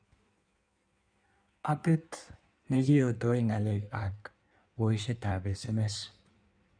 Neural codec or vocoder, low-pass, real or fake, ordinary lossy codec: codec, 16 kHz in and 24 kHz out, 1.1 kbps, FireRedTTS-2 codec; 9.9 kHz; fake; none